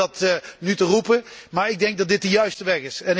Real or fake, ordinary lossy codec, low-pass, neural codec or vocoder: real; none; none; none